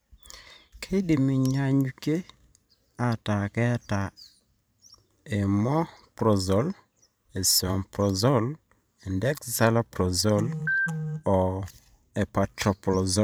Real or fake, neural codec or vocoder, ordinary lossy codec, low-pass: fake; vocoder, 44.1 kHz, 128 mel bands every 512 samples, BigVGAN v2; none; none